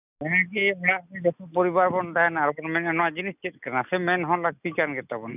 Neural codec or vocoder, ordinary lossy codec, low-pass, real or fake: none; none; 3.6 kHz; real